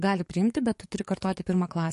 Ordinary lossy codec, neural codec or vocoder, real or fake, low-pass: MP3, 48 kbps; codec, 44.1 kHz, 7.8 kbps, DAC; fake; 14.4 kHz